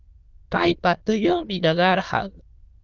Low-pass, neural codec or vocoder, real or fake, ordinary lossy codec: 7.2 kHz; autoencoder, 22.05 kHz, a latent of 192 numbers a frame, VITS, trained on many speakers; fake; Opus, 32 kbps